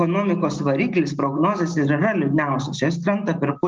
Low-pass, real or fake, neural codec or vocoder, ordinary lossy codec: 7.2 kHz; real; none; Opus, 24 kbps